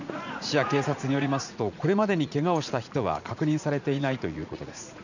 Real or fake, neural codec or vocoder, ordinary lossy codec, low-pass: fake; vocoder, 44.1 kHz, 80 mel bands, Vocos; none; 7.2 kHz